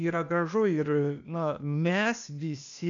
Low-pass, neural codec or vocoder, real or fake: 7.2 kHz; codec, 16 kHz, 0.8 kbps, ZipCodec; fake